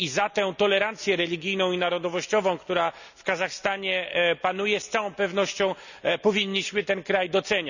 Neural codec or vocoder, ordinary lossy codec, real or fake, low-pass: none; none; real; 7.2 kHz